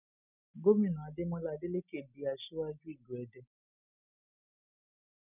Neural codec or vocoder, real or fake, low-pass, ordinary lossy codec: none; real; 3.6 kHz; none